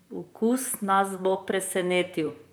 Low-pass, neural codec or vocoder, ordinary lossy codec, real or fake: none; vocoder, 44.1 kHz, 128 mel bands, Pupu-Vocoder; none; fake